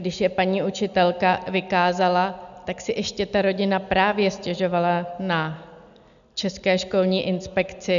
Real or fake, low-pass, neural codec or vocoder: real; 7.2 kHz; none